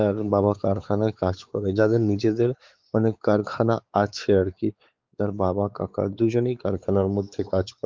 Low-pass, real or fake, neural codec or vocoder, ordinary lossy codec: 7.2 kHz; fake; codec, 16 kHz, 4 kbps, X-Codec, WavLM features, trained on Multilingual LibriSpeech; Opus, 32 kbps